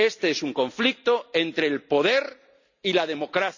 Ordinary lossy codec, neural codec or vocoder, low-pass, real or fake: none; none; 7.2 kHz; real